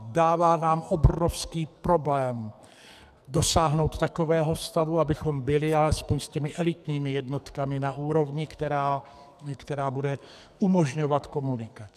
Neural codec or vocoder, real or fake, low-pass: codec, 44.1 kHz, 2.6 kbps, SNAC; fake; 14.4 kHz